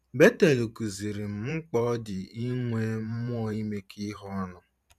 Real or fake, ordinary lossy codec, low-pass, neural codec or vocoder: fake; none; 14.4 kHz; vocoder, 44.1 kHz, 128 mel bands every 512 samples, BigVGAN v2